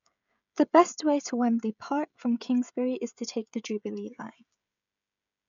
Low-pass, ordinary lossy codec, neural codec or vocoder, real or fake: 7.2 kHz; none; codec, 16 kHz, 16 kbps, FreqCodec, smaller model; fake